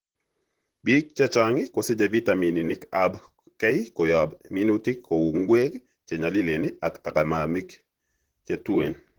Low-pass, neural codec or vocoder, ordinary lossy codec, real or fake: 19.8 kHz; vocoder, 44.1 kHz, 128 mel bands, Pupu-Vocoder; Opus, 16 kbps; fake